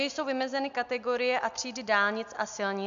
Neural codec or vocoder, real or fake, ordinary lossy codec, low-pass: none; real; MP3, 64 kbps; 7.2 kHz